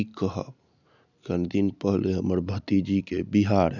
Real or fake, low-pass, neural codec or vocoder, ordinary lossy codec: real; 7.2 kHz; none; none